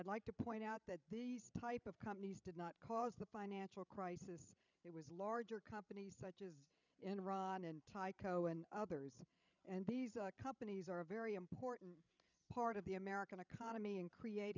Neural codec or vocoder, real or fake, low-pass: codec, 16 kHz, 16 kbps, FreqCodec, larger model; fake; 7.2 kHz